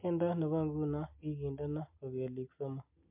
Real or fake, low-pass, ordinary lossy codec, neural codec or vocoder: real; 3.6 kHz; MP3, 32 kbps; none